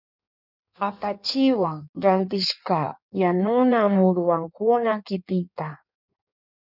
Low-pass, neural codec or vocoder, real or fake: 5.4 kHz; codec, 16 kHz in and 24 kHz out, 1.1 kbps, FireRedTTS-2 codec; fake